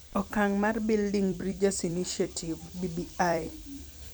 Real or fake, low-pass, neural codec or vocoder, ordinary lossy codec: fake; none; vocoder, 44.1 kHz, 128 mel bands every 256 samples, BigVGAN v2; none